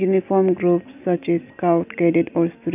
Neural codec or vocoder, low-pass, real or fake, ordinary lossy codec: none; 3.6 kHz; real; none